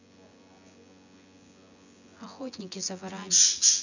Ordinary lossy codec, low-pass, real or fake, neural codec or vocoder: none; 7.2 kHz; fake; vocoder, 24 kHz, 100 mel bands, Vocos